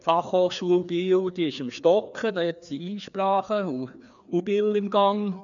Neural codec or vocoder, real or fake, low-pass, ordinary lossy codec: codec, 16 kHz, 2 kbps, FreqCodec, larger model; fake; 7.2 kHz; none